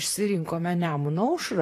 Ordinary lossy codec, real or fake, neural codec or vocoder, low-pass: AAC, 48 kbps; fake; vocoder, 44.1 kHz, 128 mel bands, Pupu-Vocoder; 14.4 kHz